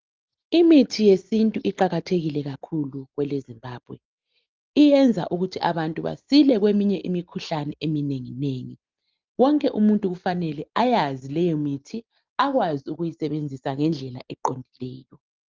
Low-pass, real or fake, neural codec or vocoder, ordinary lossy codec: 7.2 kHz; real; none; Opus, 24 kbps